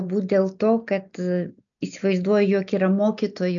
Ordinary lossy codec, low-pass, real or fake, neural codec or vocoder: MP3, 96 kbps; 7.2 kHz; real; none